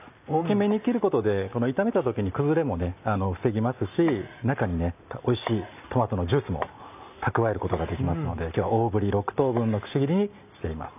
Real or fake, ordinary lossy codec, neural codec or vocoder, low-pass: real; none; none; 3.6 kHz